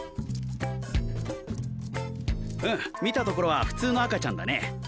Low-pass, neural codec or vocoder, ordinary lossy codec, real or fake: none; none; none; real